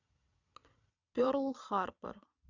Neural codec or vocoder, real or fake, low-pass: vocoder, 22.05 kHz, 80 mel bands, Vocos; fake; 7.2 kHz